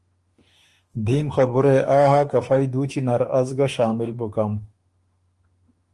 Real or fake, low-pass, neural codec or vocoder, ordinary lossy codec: fake; 10.8 kHz; autoencoder, 48 kHz, 32 numbers a frame, DAC-VAE, trained on Japanese speech; Opus, 24 kbps